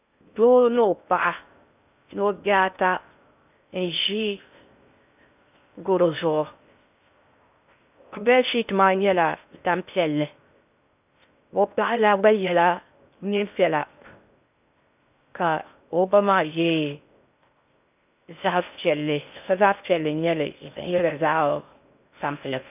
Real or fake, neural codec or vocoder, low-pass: fake; codec, 16 kHz in and 24 kHz out, 0.6 kbps, FocalCodec, streaming, 4096 codes; 3.6 kHz